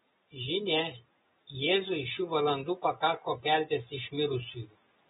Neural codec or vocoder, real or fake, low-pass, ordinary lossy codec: none; real; 19.8 kHz; AAC, 16 kbps